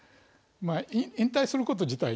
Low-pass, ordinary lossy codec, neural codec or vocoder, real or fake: none; none; none; real